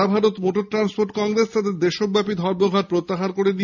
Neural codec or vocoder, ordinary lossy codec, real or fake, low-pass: none; none; real; 7.2 kHz